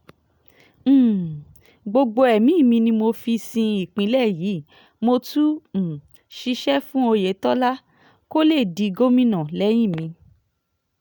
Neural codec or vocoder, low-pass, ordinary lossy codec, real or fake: none; none; none; real